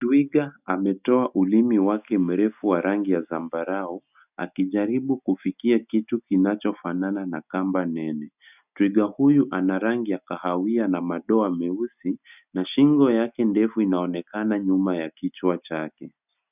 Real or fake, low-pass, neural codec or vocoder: real; 3.6 kHz; none